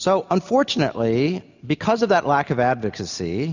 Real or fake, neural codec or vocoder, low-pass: real; none; 7.2 kHz